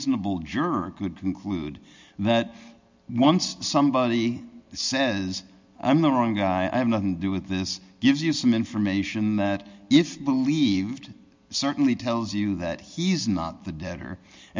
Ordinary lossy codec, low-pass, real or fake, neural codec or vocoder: MP3, 64 kbps; 7.2 kHz; real; none